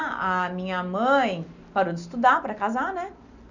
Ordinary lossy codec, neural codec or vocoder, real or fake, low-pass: none; none; real; 7.2 kHz